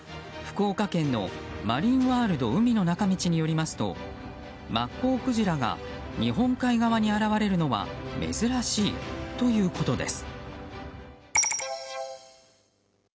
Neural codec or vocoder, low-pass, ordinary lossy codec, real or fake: none; none; none; real